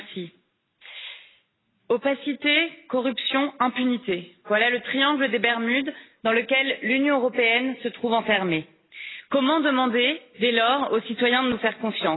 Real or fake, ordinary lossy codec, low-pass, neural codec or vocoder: real; AAC, 16 kbps; 7.2 kHz; none